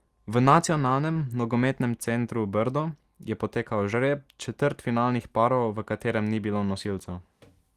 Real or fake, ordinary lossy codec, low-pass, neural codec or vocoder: real; Opus, 24 kbps; 14.4 kHz; none